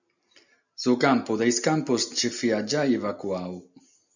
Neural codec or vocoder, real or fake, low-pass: none; real; 7.2 kHz